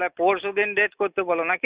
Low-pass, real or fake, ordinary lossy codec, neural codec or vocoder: 3.6 kHz; real; Opus, 64 kbps; none